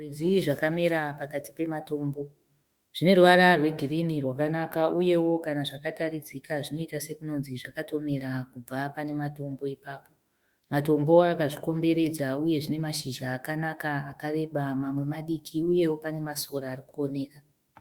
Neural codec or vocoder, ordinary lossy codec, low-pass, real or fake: autoencoder, 48 kHz, 32 numbers a frame, DAC-VAE, trained on Japanese speech; Opus, 64 kbps; 19.8 kHz; fake